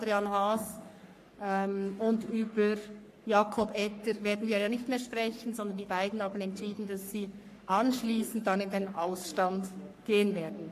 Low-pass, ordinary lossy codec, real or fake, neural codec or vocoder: 14.4 kHz; AAC, 64 kbps; fake; codec, 44.1 kHz, 3.4 kbps, Pupu-Codec